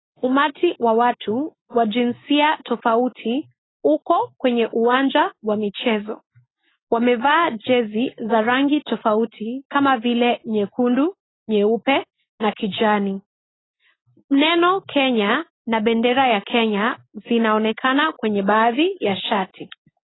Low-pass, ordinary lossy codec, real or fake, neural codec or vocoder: 7.2 kHz; AAC, 16 kbps; real; none